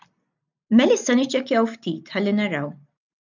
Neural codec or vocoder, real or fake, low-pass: none; real; 7.2 kHz